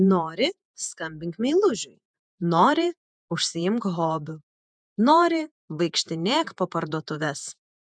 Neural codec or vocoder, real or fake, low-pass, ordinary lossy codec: vocoder, 48 kHz, 128 mel bands, Vocos; fake; 9.9 kHz; MP3, 96 kbps